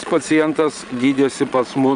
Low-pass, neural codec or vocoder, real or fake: 9.9 kHz; vocoder, 22.05 kHz, 80 mel bands, Vocos; fake